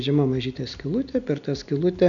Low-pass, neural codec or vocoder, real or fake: 7.2 kHz; none; real